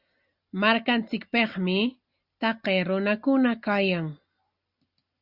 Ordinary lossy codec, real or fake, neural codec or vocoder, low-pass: Opus, 64 kbps; real; none; 5.4 kHz